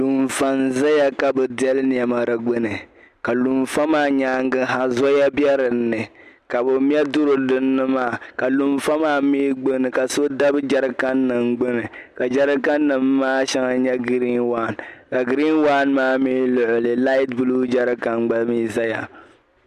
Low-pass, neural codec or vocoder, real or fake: 14.4 kHz; none; real